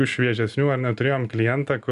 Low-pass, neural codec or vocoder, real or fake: 10.8 kHz; none; real